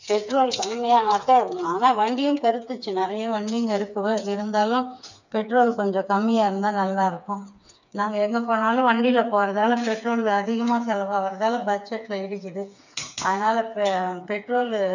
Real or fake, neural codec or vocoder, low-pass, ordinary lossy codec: fake; codec, 16 kHz, 4 kbps, FreqCodec, smaller model; 7.2 kHz; none